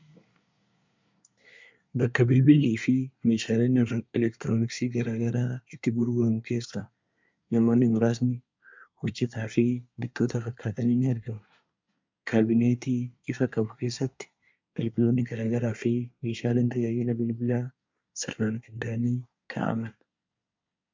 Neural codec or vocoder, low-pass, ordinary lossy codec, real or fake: codec, 24 kHz, 1 kbps, SNAC; 7.2 kHz; MP3, 64 kbps; fake